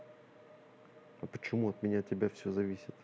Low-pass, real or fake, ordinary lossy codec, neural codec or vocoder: none; real; none; none